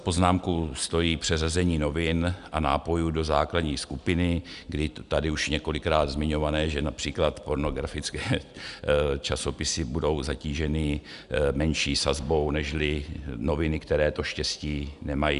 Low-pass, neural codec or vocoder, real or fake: 10.8 kHz; none; real